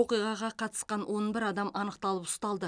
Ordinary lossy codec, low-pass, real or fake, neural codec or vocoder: none; 9.9 kHz; real; none